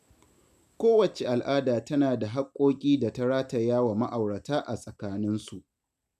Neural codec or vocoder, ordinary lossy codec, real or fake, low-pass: none; none; real; 14.4 kHz